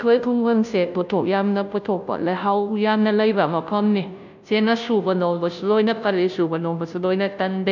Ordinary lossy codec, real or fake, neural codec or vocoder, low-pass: none; fake; codec, 16 kHz, 0.5 kbps, FunCodec, trained on Chinese and English, 25 frames a second; 7.2 kHz